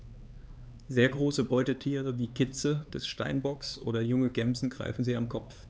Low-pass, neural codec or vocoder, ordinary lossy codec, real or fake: none; codec, 16 kHz, 4 kbps, X-Codec, HuBERT features, trained on LibriSpeech; none; fake